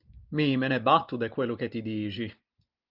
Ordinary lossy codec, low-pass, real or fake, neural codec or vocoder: Opus, 24 kbps; 5.4 kHz; real; none